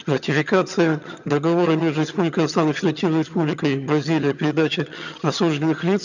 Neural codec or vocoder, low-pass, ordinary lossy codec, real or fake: vocoder, 22.05 kHz, 80 mel bands, HiFi-GAN; 7.2 kHz; none; fake